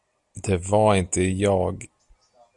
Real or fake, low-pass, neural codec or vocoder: real; 10.8 kHz; none